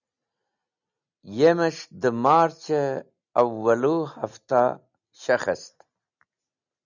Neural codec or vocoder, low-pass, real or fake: none; 7.2 kHz; real